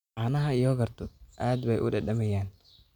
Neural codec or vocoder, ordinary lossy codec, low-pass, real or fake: none; none; 19.8 kHz; real